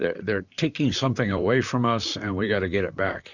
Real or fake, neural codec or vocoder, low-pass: fake; vocoder, 44.1 kHz, 128 mel bands, Pupu-Vocoder; 7.2 kHz